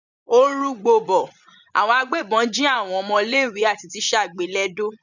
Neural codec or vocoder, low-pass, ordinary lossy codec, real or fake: vocoder, 24 kHz, 100 mel bands, Vocos; 7.2 kHz; none; fake